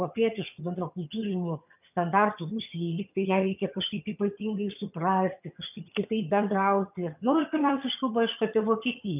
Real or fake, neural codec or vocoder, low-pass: fake; vocoder, 22.05 kHz, 80 mel bands, HiFi-GAN; 3.6 kHz